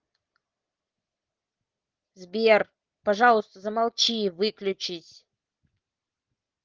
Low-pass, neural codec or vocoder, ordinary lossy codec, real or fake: 7.2 kHz; none; Opus, 16 kbps; real